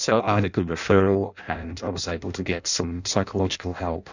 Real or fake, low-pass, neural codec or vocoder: fake; 7.2 kHz; codec, 16 kHz in and 24 kHz out, 0.6 kbps, FireRedTTS-2 codec